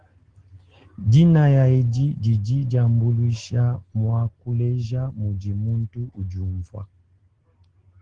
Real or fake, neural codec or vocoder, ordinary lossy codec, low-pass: real; none; Opus, 16 kbps; 9.9 kHz